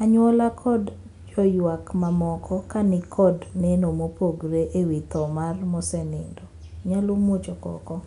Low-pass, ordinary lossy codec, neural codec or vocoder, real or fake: 10.8 kHz; none; none; real